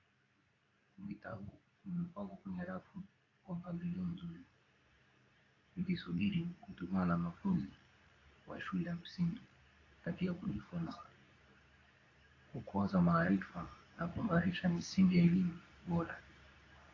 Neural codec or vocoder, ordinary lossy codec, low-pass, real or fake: codec, 24 kHz, 0.9 kbps, WavTokenizer, medium speech release version 2; AAC, 32 kbps; 7.2 kHz; fake